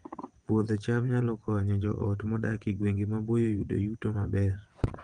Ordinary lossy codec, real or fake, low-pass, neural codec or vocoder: Opus, 32 kbps; fake; 9.9 kHz; vocoder, 22.05 kHz, 80 mel bands, WaveNeXt